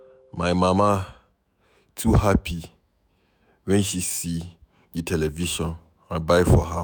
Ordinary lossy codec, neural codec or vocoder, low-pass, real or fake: none; autoencoder, 48 kHz, 128 numbers a frame, DAC-VAE, trained on Japanese speech; none; fake